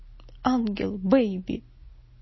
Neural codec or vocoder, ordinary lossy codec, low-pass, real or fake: none; MP3, 24 kbps; 7.2 kHz; real